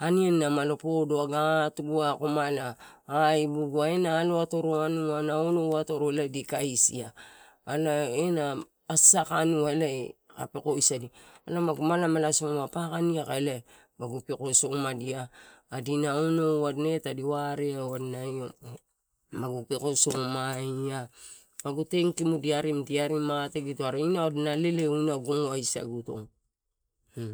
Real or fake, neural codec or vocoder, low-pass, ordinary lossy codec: real; none; none; none